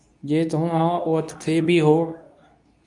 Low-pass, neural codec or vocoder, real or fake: 10.8 kHz; codec, 24 kHz, 0.9 kbps, WavTokenizer, medium speech release version 2; fake